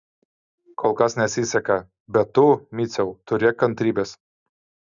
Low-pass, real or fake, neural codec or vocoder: 7.2 kHz; real; none